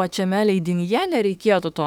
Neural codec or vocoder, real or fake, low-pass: autoencoder, 48 kHz, 32 numbers a frame, DAC-VAE, trained on Japanese speech; fake; 19.8 kHz